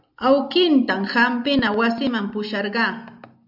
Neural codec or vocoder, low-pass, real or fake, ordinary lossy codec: none; 5.4 kHz; real; AAC, 48 kbps